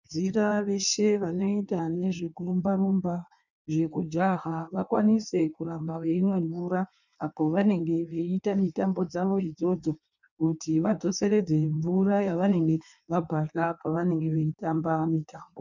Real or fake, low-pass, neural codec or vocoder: fake; 7.2 kHz; codec, 16 kHz in and 24 kHz out, 1.1 kbps, FireRedTTS-2 codec